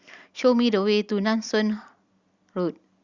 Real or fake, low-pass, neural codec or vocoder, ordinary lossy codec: real; 7.2 kHz; none; Opus, 64 kbps